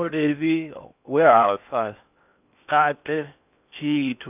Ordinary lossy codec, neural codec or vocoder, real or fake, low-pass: none; codec, 16 kHz in and 24 kHz out, 0.8 kbps, FocalCodec, streaming, 65536 codes; fake; 3.6 kHz